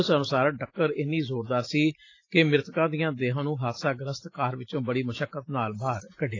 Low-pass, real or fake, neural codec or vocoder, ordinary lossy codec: 7.2 kHz; real; none; AAC, 32 kbps